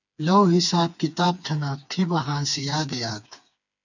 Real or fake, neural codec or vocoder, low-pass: fake; codec, 16 kHz, 4 kbps, FreqCodec, smaller model; 7.2 kHz